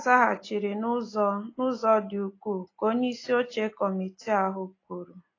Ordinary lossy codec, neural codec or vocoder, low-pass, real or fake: AAC, 32 kbps; none; 7.2 kHz; real